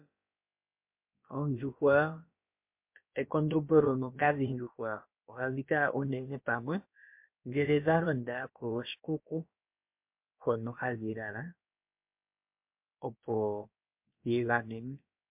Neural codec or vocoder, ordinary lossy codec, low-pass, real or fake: codec, 16 kHz, about 1 kbps, DyCAST, with the encoder's durations; MP3, 32 kbps; 3.6 kHz; fake